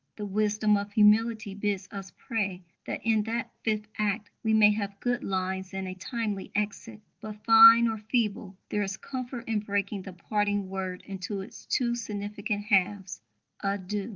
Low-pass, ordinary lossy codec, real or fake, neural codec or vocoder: 7.2 kHz; Opus, 32 kbps; real; none